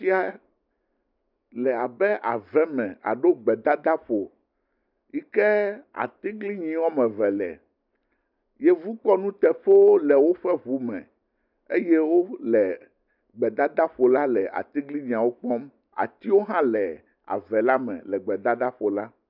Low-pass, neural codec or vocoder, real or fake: 5.4 kHz; none; real